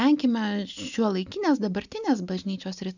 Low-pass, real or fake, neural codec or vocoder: 7.2 kHz; real; none